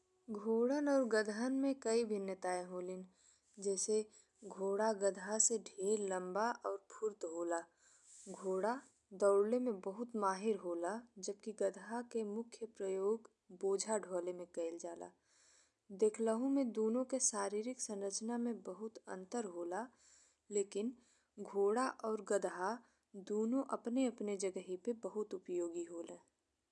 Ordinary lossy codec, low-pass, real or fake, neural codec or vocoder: none; 10.8 kHz; real; none